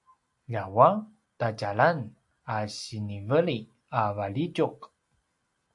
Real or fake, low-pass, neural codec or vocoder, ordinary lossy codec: real; 10.8 kHz; none; AAC, 64 kbps